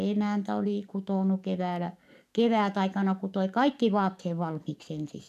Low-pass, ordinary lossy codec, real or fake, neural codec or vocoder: 14.4 kHz; none; fake; codec, 44.1 kHz, 7.8 kbps, DAC